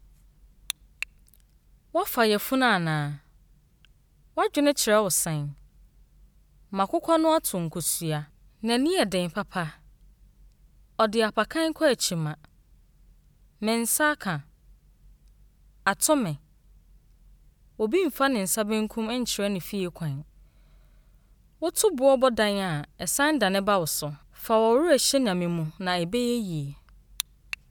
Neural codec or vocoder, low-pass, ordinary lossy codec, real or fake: none; none; none; real